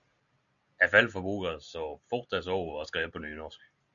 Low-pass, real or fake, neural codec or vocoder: 7.2 kHz; real; none